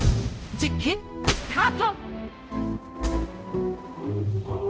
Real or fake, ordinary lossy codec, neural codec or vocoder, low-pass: fake; none; codec, 16 kHz, 0.5 kbps, X-Codec, HuBERT features, trained on balanced general audio; none